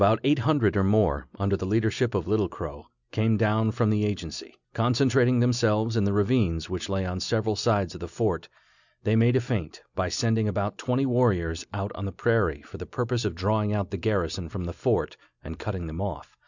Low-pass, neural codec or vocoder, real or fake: 7.2 kHz; none; real